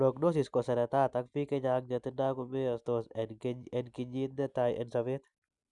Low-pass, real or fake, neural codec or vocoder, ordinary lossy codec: 10.8 kHz; real; none; none